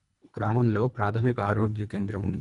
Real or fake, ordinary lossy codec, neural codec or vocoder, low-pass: fake; none; codec, 24 kHz, 1.5 kbps, HILCodec; 10.8 kHz